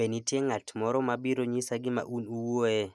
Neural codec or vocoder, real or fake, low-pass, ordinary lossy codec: none; real; none; none